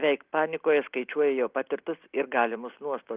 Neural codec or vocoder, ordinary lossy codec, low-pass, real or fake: none; Opus, 32 kbps; 3.6 kHz; real